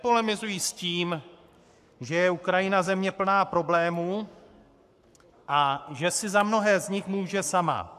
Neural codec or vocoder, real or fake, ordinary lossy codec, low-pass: codec, 44.1 kHz, 7.8 kbps, Pupu-Codec; fake; AAC, 96 kbps; 14.4 kHz